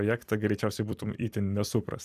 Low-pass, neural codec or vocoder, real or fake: 14.4 kHz; vocoder, 44.1 kHz, 128 mel bands every 256 samples, BigVGAN v2; fake